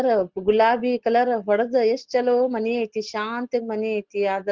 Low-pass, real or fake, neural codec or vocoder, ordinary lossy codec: 7.2 kHz; real; none; Opus, 16 kbps